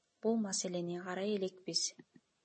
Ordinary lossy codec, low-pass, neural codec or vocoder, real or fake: MP3, 32 kbps; 9.9 kHz; none; real